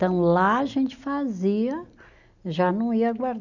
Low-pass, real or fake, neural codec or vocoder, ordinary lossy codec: 7.2 kHz; real; none; none